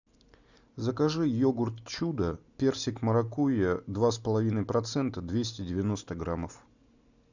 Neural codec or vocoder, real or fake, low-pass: none; real; 7.2 kHz